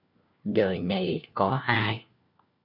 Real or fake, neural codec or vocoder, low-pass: fake; codec, 16 kHz, 1 kbps, FunCodec, trained on LibriTTS, 50 frames a second; 5.4 kHz